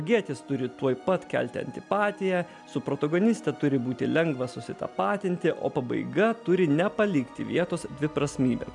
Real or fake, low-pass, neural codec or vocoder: real; 10.8 kHz; none